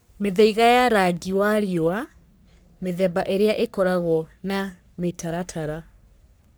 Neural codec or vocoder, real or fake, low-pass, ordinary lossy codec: codec, 44.1 kHz, 3.4 kbps, Pupu-Codec; fake; none; none